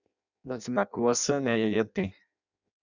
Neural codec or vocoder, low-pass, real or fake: codec, 16 kHz in and 24 kHz out, 0.6 kbps, FireRedTTS-2 codec; 7.2 kHz; fake